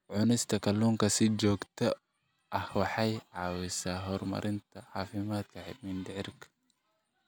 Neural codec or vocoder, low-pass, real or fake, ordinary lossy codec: none; none; real; none